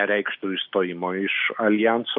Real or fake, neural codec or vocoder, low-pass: real; none; 5.4 kHz